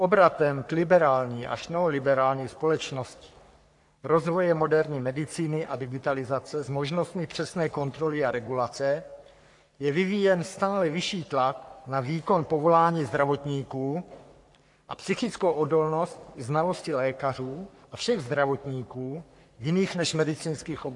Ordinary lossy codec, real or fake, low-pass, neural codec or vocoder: AAC, 64 kbps; fake; 10.8 kHz; codec, 44.1 kHz, 3.4 kbps, Pupu-Codec